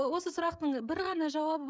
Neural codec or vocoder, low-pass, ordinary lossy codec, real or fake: codec, 16 kHz, 16 kbps, FreqCodec, smaller model; none; none; fake